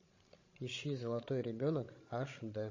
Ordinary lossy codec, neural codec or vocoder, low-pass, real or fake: MP3, 32 kbps; codec, 16 kHz, 16 kbps, FreqCodec, larger model; 7.2 kHz; fake